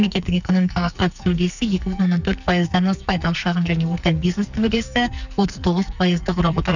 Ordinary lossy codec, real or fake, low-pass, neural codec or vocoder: none; fake; 7.2 kHz; codec, 44.1 kHz, 2.6 kbps, SNAC